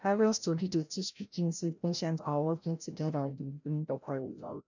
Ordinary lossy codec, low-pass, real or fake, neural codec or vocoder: none; 7.2 kHz; fake; codec, 16 kHz, 0.5 kbps, FreqCodec, larger model